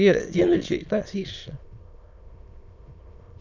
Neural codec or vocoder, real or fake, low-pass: autoencoder, 22.05 kHz, a latent of 192 numbers a frame, VITS, trained on many speakers; fake; 7.2 kHz